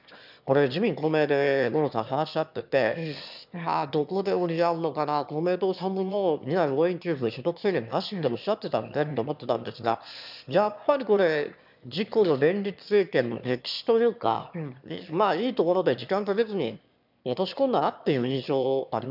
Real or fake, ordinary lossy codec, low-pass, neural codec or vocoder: fake; none; 5.4 kHz; autoencoder, 22.05 kHz, a latent of 192 numbers a frame, VITS, trained on one speaker